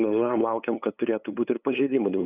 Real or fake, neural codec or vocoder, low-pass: fake; codec, 16 kHz, 8 kbps, FunCodec, trained on LibriTTS, 25 frames a second; 3.6 kHz